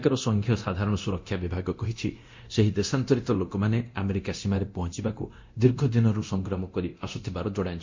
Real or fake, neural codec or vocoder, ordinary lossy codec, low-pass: fake; codec, 24 kHz, 0.9 kbps, DualCodec; MP3, 48 kbps; 7.2 kHz